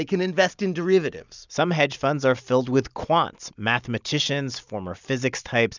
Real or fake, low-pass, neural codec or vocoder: real; 7.2 kHz; none